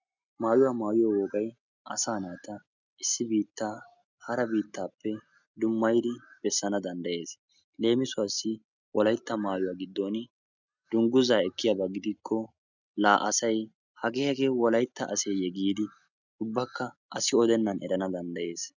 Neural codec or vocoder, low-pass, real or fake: none; 7.2 kHz; real